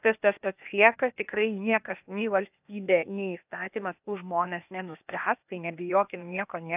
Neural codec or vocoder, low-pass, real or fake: codec, 16 kHz, 0.8 kbps, ZipCodec; 3.6 kHz; fake